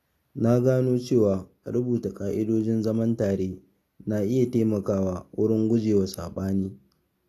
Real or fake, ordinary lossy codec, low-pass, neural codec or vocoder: real; AAC, 48 kbps; 14.4 kHz; none